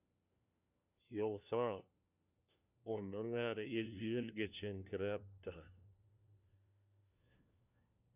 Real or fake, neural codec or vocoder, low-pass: fake; codec, 16 kHz, 1 kbps, FunCodec, trained on LibriTTS, 50 frames a second; 3.6 kHz